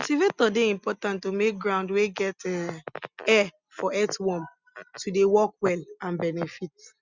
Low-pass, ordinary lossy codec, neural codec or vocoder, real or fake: 7.2 kHz; Opus, 64 kbps; none; real